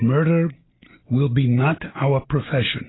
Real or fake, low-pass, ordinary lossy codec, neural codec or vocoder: real; 7.2 kHz; AAC, 16 kbps; none